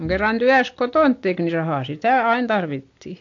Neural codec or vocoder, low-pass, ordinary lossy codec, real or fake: none; 7.2 kHz; AAC, 48 kbps; real